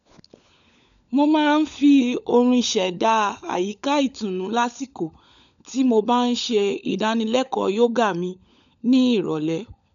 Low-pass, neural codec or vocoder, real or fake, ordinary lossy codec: 7.2 kHz; codec, 16 kHz, 16 kbps, FunCodec, trained on LibriTTS, 50 frames a second; fake; none